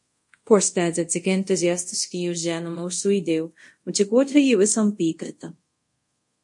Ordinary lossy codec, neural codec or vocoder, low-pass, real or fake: MP3, 48 kbps; codec, 24 kHz, 0.5 kbps, DualCodec; 10.8 kHz; fake